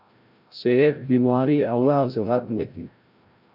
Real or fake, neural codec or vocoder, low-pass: fake; codec, 16 kHz, 0.5 kbps, FreqCodec, larger model; 5.4 kHz